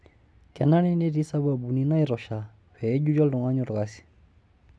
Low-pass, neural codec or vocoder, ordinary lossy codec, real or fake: none; none; none; real